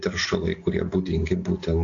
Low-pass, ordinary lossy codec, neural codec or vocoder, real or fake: 7.2 kHz; AAC, 48 kbps; none; real